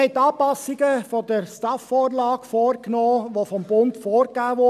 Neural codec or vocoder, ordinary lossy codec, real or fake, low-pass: none; none; real; 14.4 kHz